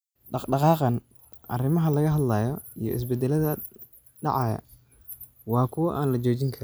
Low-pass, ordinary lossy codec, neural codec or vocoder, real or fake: none; none; none; real